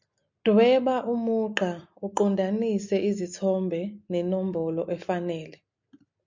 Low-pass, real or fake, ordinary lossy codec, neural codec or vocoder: 7.2 kHz; real; MP3, 64 kbps; none